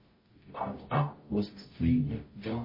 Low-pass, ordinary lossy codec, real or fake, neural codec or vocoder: 5.4 kHz; MP3, 24 kbps; fake; codec, 44.1 kHz, 0.9 kbps, DAC